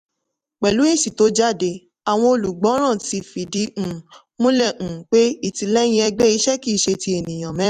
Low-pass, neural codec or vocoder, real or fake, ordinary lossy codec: 14.4 kHz; vocoder, 44.1 kHz, 128 mel bands every 256 samples, BigVGAN v2; fake; none